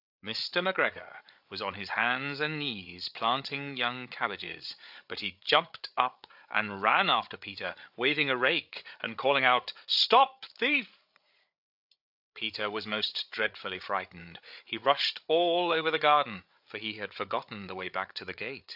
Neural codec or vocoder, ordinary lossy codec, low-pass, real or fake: codec, 16 kHz, 8 kbps, FreqCodec, larger model; AAC, 48 kbps; 5.4 kHz; fake